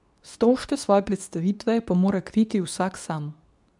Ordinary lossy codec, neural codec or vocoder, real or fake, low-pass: none; codec, 24 kHz, 0.9 kbps, WavTokenizer, medium speech release version 2; fake; 10.8 kHz